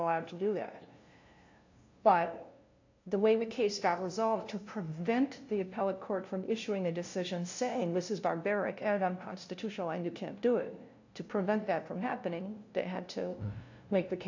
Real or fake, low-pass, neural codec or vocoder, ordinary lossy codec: fake; 7.2 kHz; codec, 16 kHz, 0.5 kbps, FunCodec, trained on LibriTTS, 25 frames a second; AAC, 48 kbps